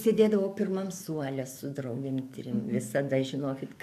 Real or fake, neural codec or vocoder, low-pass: fake; codec, 44.1 kHz, 7.8 kbps, DAC; 14.4 kHz